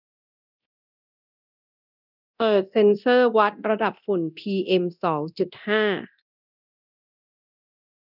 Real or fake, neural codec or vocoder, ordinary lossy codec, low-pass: fake; codec, 24 kHz, 0.9 kbps, DualCodec; none; 5.4 kHz